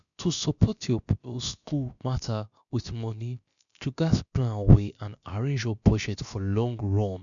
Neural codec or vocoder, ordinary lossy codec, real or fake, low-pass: codec, 16 kHz, about 1 kbps, DyCAST, with the encoder's durations; none; fake; 7.2 kHz